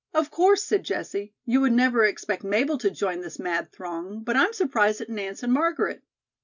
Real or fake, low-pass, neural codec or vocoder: real; 7.2 kHz; none